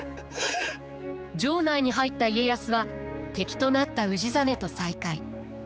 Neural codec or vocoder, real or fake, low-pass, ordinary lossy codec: codec, 16 kHz, 4 kbps, X-Codec, HuBERT features, trained on general audio; fake; none; none